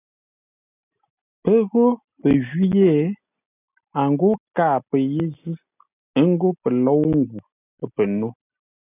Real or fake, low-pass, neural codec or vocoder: real; 3.6 kHz; none